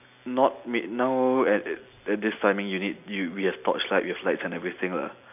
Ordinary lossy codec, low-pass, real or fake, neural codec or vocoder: none; 3.6 kHz; real; none